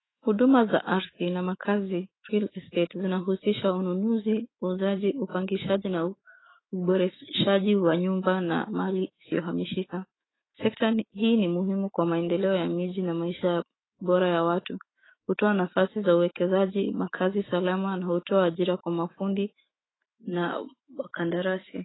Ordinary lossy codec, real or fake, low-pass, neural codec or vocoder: AAC, 16 kbps; fake; 7.2 kHz; autoencoder, 48 kHz, 128 numbers a frame, DAC-VAE, trained on Japanese speech